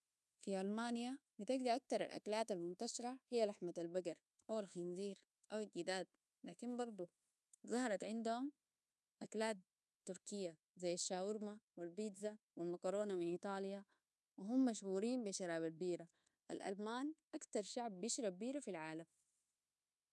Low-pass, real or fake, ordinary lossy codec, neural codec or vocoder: none; fake; none; codec, 24 kHz, 1.2 kbps, DualCodec